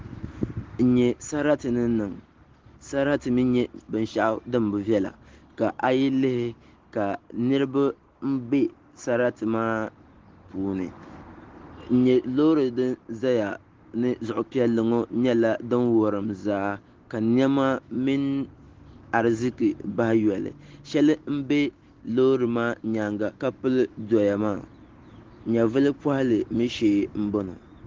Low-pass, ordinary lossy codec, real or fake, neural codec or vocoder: 7.2 kHz; Opus, 16 kbps; real; none